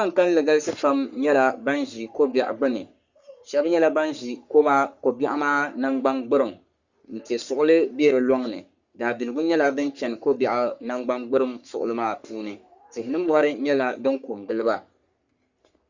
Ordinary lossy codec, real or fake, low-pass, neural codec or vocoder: Opus, 64 kbps; fake; 7.2 kHz; codec, 44.1 kHz, 3.4 kbps, Pupu-Codec